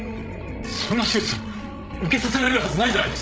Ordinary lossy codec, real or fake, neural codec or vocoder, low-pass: none; fake; codec, 16 kHz, 16 kbps, FreqCodec, larger model; none